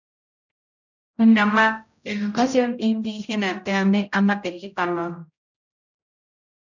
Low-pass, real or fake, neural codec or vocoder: 7.2 kHz; fake; codec, 16 kHz, 0.5 kbps, X-Codec, HuBERT features, trained on general audio